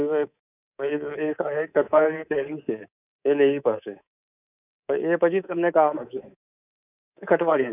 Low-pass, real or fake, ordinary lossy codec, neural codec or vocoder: 3.6 kHz; fake; none; codec, 24 kHz, 3.1 kbps, DualCodec